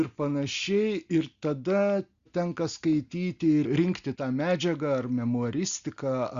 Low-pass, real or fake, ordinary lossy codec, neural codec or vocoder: 7.2 kHz; real; Opus, 64 kbps; none